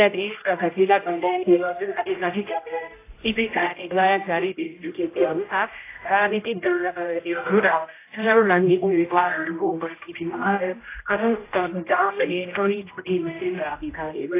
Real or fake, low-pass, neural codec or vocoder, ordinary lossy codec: fake; 3.6 kHz; codec, 16 kHz, 0.5 kbps, X-Codec, HuBERT features, trained on general audio; AAC, 24 kbps